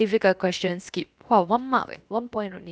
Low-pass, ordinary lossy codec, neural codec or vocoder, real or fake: none; none; codec, 16 kHz, 0.7 kbps, FocalCodec; fake